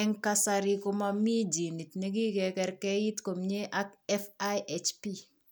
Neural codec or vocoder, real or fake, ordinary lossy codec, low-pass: none; real; none; none